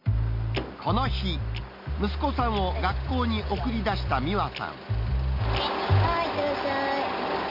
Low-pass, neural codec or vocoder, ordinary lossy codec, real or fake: 5.4 kHz; none; none; real